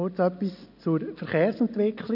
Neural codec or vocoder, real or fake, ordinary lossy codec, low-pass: none; real; none; 5.4 kHz